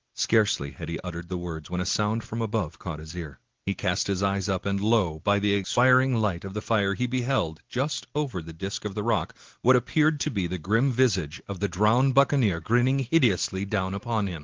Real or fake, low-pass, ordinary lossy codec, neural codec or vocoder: real; 7.2 kHz; Opus, 16 kbps; none